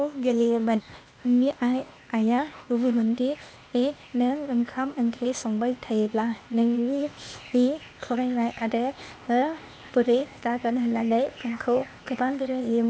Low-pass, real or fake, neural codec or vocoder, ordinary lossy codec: none; fake; codec, 16 kHz, 0.8 kbps, ZipCodec; none